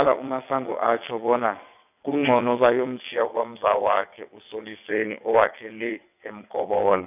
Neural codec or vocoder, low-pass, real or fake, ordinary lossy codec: vocoder, 22.05 kHz, 80 mel bands, WaveNeXt; 3.6 kHz; fake; none